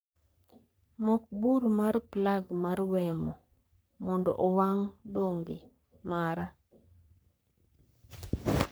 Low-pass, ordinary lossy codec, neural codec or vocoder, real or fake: none; none; codec, 44.1 kHz, 3.4 kbps, Pupu-Codec; fake